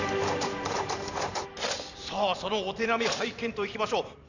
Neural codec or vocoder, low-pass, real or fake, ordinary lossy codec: vocoder, 44.1 kHz, 128 mel bands every 512 samples, BigVGAN v2; 7.2 kHz; fake; none